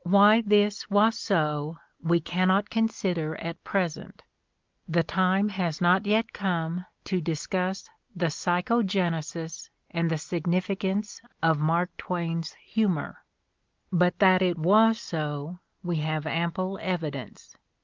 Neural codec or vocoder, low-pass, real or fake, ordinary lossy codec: none; 7.2 kHz; real; Opus, 24 kbps